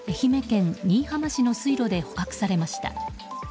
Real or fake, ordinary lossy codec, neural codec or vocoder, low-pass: real; none; none; none